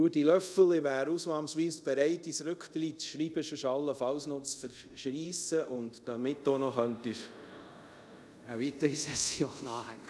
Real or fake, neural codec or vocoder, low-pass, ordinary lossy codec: fake; codec, 24 kHz, 0.5 kbps, DualCodec; none; none